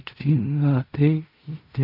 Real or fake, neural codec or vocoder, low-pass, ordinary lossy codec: fake; codec, 16 kHz in and 24 kHz out, 0.4 kbps, LongCat-Audio-Codec, fine tuned four codebook decoder; 5.4 kHz; none